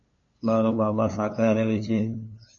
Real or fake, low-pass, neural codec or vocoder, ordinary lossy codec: fake; 7.2 kHz; codec, 16 kHz, 2 kbps, FunCodec, trained on LibriTTS, 25 frames a second; MP3, 32 kbps